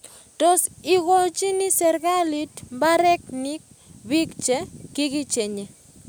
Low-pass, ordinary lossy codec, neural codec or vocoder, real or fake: none; none; none; real